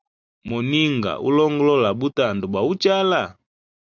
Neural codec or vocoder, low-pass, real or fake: none; 7.2 kHz; real